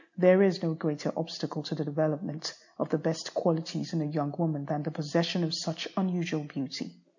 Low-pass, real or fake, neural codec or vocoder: 7.2 kHz; real; none